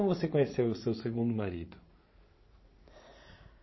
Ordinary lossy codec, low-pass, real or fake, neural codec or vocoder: MP3, 24 kbps; 7.2 kHz; fake; vocoder, 44.1 kHz, 80 mel bands, Vocos